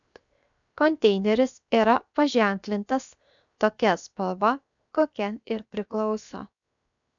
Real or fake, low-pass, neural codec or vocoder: fake; 7.2 kHz; codec, 16 kHz, 0.7 kbps, FocalCodec